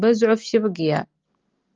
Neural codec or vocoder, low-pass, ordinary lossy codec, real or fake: none; 7.2 kHz; Opus, 32 kbps; real